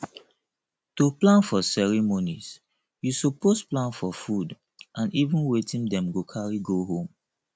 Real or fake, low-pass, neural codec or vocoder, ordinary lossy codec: real; none; none; none